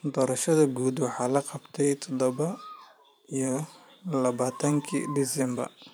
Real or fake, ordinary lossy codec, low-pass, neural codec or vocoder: fake; none; none; vocoder, 44.1 kHz, 128 mel bands every 512 samples, BigVGAN v2